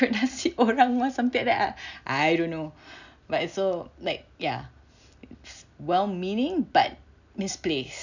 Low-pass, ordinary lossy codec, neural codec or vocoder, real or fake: 7.2 kHz; none; none; real